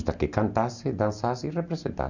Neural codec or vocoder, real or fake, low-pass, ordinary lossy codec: none; real; 7.2 kHz; none